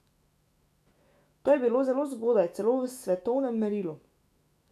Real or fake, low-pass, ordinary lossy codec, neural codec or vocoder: fake; 14.4 kHz; none; autoencoder, 48 kHz, 128 numbers a frame, DAC-VAE, trained on Japanese speech